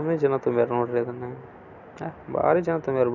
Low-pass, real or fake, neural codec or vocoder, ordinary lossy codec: 7.2 kHz; real; none; none